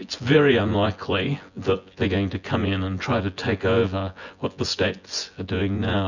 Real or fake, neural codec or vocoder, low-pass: fake; vocoder, 24 kHz, 100 mel bands, Vocos; 7.2 kHz